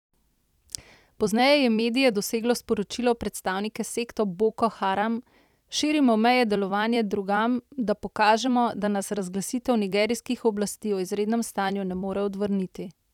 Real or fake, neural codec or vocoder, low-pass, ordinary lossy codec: fake; vocoder, 44.1 kHz, 128 mel bands every 256 samples, BigVGAN v2; 19.8 kHz; none